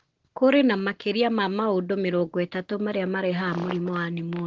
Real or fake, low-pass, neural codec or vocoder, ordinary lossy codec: real; 7.2 kHz; none; Opus, 16 kbps